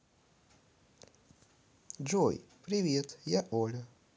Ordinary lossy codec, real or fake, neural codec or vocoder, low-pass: none; real; none; none